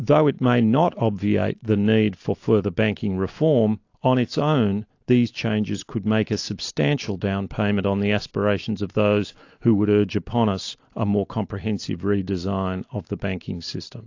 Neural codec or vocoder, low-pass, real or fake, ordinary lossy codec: none; 7.2 kHz; real; AAC, 48 kbps